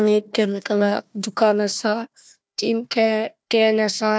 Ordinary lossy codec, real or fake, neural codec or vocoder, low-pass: none; fake; codec, 16 kHz, 1 kbps, FunCodec, trained on Chinese and English, 50 frames a second; none